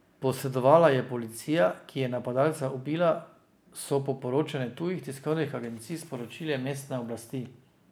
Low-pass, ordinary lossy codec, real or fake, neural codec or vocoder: none; none; real; none